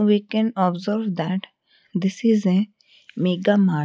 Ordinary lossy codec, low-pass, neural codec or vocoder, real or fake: none; none; none; real